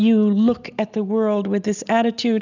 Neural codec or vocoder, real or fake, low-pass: none; real; 7.2 kHz